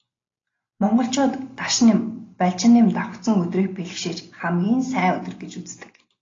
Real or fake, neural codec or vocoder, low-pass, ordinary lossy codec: real; none; 7.2 kHz; AAC, 32 kbps